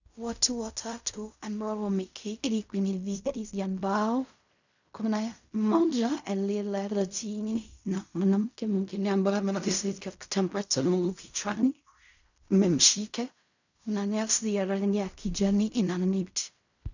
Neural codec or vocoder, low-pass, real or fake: codec, 16 kHz in and 24 kHz out, 0.4 kbps, LongCat-Audio-Codec, fine tuned four codebook decoder; 7.2 kHz; fake